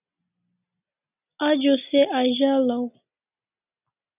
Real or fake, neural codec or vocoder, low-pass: real; none; 3.6 kHz